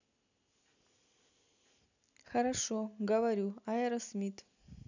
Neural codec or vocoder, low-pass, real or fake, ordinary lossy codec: none; 7.2 kHz; real; none